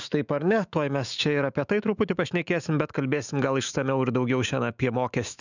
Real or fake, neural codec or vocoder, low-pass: fake; autoencoder, 48 kHz, 128 numbers a frame, DAC-VAE, trained on Japanese speech; 7.2 kHz